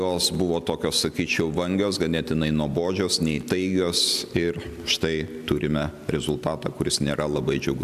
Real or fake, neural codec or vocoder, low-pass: real; none; 14.4 kHz